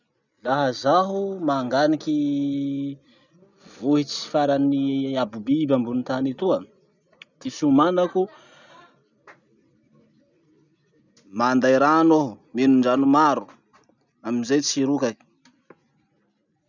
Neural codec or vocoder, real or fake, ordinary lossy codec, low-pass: none; real; none; 7.2 kHz